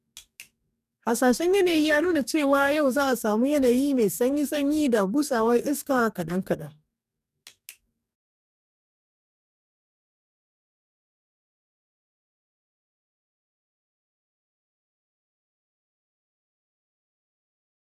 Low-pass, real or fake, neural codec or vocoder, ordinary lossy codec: 14.4 kHz; fake; codec, 44.1 kHz, 2.6 kbps, DAC; none